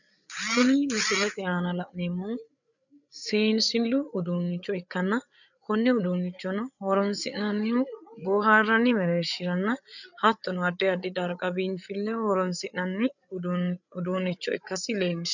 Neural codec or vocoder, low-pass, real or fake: codec, 16 kHz, 8 kbps, FreqCodec, larger model; 7.2 kHz; fake